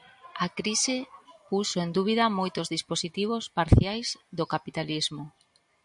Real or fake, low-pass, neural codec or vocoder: real; 10.8 kHz; none